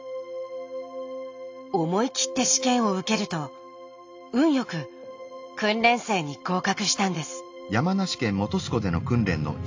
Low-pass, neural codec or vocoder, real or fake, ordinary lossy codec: 7.2 kHz; none; real; AAC, 48 kbps